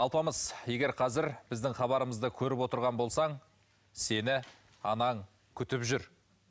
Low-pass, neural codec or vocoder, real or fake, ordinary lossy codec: none; none; real; none